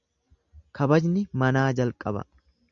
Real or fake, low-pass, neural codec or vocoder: real; 7.2 kHz; none